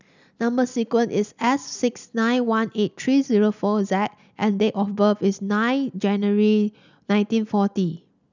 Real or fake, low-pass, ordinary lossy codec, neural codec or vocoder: real; 7.2 kHz; none; none